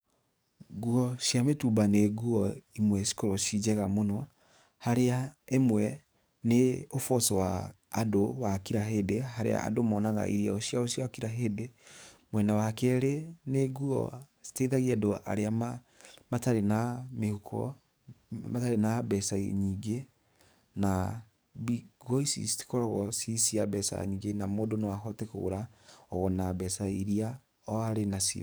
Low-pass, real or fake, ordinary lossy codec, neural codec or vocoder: none; fake; none; codec, 44.1 kHz, 7.8 kbps, DAC